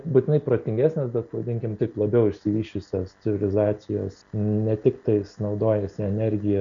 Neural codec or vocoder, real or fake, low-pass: none; real; 7.2 kHz